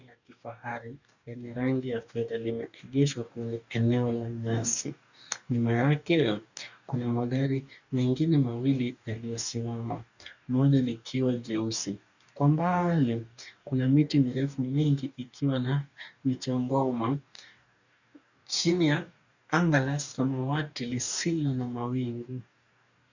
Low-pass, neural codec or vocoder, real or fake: 7.2 kHz; codec, 44.1 kHz, 2.6 kbps, DAC; fake